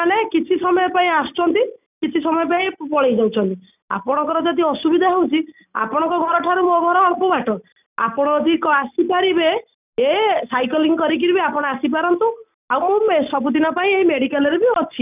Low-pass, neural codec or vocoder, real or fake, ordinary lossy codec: 3.6 kHz; none; real; none